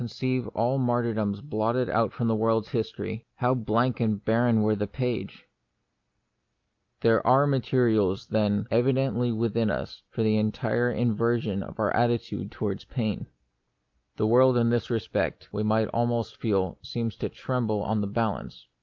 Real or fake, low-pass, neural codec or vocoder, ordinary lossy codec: real; 7.2 kHz; none; Opus, 24 kbps